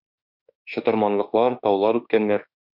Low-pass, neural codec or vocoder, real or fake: 5.4 kHz; autoencoder, 48 kHz, 32 numbers a frame, DAC-VAE, trained on Japanese speech; fake